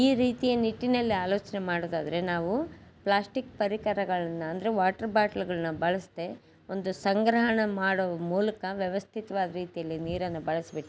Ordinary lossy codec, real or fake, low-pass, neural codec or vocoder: none; real; none; none